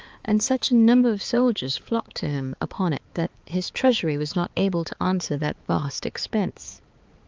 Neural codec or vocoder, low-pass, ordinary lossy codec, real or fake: codec, 16 kHz, 4 kbps, X-Codec, HuBERT features, trained on balanced general audio; 7.2 kHz; Opus, 24 kbps; fake